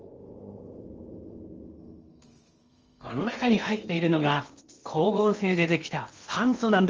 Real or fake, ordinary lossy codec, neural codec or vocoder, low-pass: fake; Opus, 24 kbps; codec, 16 kHz in and 24 kHz out, 0.8 kbps, FocalCodec, streaming, 65536 codes; 7.2 kHz